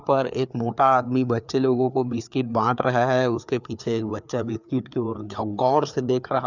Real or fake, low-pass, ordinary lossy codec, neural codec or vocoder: fake; 7.2 kHz; none; codec, 16 kHz, 4 kbps, FreqCodec, larger model